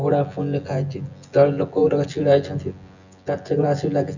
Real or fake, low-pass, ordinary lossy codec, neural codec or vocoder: fake; 7.2 kHz; none; vocoder, 24 kHz, 100 mel bands, Vocos